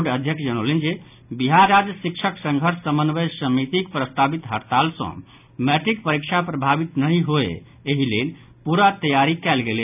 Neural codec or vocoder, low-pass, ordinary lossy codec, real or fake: none; 3.6 kHz; none; real